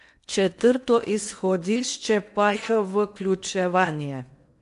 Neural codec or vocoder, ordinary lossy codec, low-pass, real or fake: codec, 16 kHz in and 24 kHz out, 0.8 kbps, FocalCodec, streaming, 65536 codes; MP3, 64 kbps; 10.8 kHz; fake